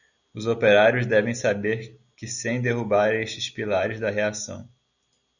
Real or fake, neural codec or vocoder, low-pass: real; none; 7.2 kHz